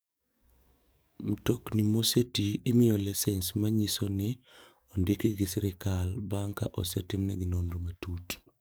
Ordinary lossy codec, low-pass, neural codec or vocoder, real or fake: none; none; codec, 44.1 kHz, 7.8 kbps, DAC; fake